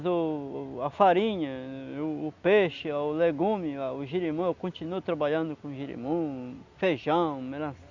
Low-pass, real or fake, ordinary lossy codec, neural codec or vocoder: 7.2 kHz; real; Opus, 64 kbps; none